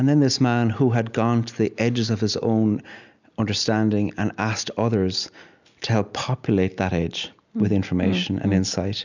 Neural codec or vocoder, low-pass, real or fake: none; 7.2 kHz; real